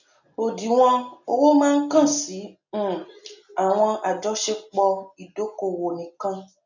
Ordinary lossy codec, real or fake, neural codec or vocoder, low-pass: none; real; none; 7.2 kHz